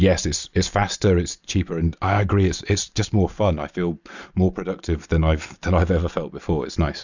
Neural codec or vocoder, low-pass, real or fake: none; 7.2 kHz; real